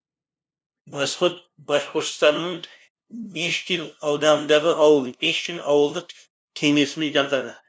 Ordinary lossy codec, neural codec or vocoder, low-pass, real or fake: none; codec, 16 kHz, 0.5 kbps, FunCodec, trained on LibriTTS, 25 frames a second; none; fake